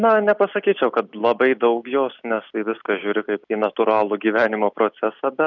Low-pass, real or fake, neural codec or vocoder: 7.2 kHz; real; none